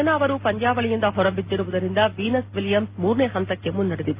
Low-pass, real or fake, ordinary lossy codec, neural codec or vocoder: 3.6 kHz; real; Opus, 32 kbps; none